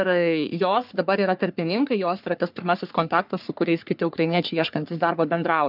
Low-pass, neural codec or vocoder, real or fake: 5.4 kHz; codec, 44.1 kHz, 3.4 kbps, Pupu-Codec; fake